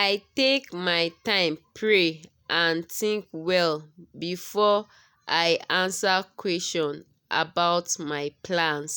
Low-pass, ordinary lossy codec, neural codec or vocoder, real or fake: none; none; none; real